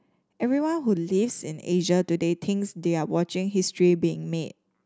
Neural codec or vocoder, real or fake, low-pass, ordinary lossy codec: none; real; none; none